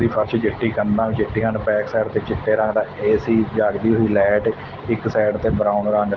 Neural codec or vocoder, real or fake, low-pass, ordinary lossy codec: none; real; 7.2 kHz; Opus, 16 kbps